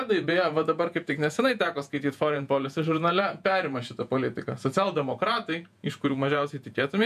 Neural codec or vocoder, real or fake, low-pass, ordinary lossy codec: vocoder, 48 kHz, 128 mel bands, Vocos; fake; 14.4 kHz; MP3, 96 kbps